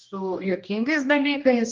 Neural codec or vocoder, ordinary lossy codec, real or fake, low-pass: codec, 16 kHz, 1 kbps, X-Codec, HuBERT features, trained on general audio; Opus, 24 kbps; fake; 7.2 kHz